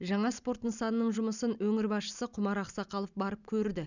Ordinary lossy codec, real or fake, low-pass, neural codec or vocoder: none; real; 7.2 kHz; none